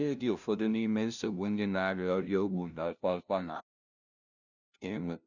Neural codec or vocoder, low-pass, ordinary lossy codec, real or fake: codec, 16 kHz, 0.5 kbps, FunCodec, trained on LibriTTS, 25 frames a second; 7.2 kHz; none; fake